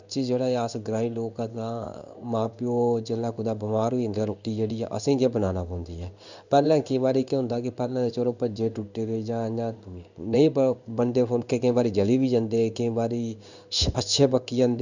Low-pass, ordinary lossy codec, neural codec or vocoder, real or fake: 7.2 kHz; none; codec, 16 kHz in and 24 kHz out, 1 kbps, XY-Tokenizer; fake